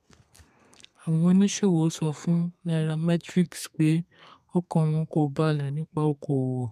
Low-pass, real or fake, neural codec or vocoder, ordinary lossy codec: 14.4 kHz; fake; codec, 32 kHz, 1.9 kbps, SNAC; none